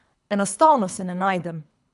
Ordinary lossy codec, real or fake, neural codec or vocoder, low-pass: AAC, 96 kbps; fake; codec, 24 kHz, 3 kbps, HILCodec; 10.8 kHz